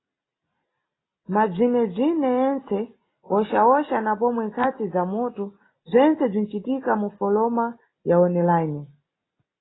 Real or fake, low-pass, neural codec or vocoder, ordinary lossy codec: real; 7.2 kHz; none; AAC, 16 kbps